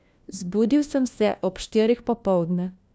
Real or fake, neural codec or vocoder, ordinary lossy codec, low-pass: fake; codec, 16 kHz, 1 kbps, FunCodec, trained on LibriTTS, 50 frames a second; none; none